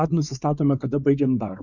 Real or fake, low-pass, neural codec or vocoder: fake; 7.2 kHz; codec, 16 kHz, 4 kbps, X-Codec, WavLM features, trained on Multilingual LibriSpeech